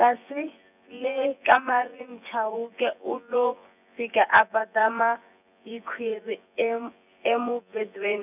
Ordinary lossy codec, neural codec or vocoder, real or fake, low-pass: none; vocoder, 24 kHz, 100 mel bands, Vocos; fake; 3.6 kHz